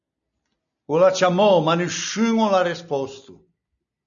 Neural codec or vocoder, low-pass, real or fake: none; 7.2 kHz; real